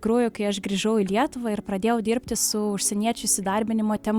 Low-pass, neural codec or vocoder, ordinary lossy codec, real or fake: 19.8 kHz; none; Opus, 64 kbps; real